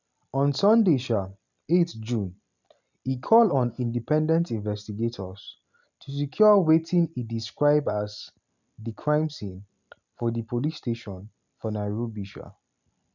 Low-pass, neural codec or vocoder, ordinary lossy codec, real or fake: 7.2 kHz; none; none; real